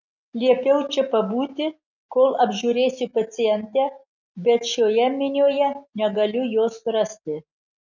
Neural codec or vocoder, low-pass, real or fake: none; 7.2 kHz; real